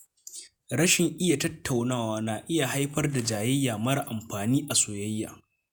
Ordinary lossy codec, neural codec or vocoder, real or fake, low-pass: none; vocoder, 48 kHz, 128 mel bands, Vocos; fake; none